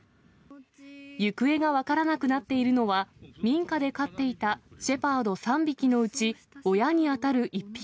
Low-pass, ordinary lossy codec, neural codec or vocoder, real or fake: none; none; none; real